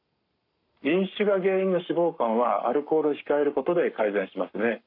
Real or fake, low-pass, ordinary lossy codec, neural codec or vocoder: fake; 5.4 kHz; none; codec, 44.1 kHz, 7.8 kbps, Pupu-Codec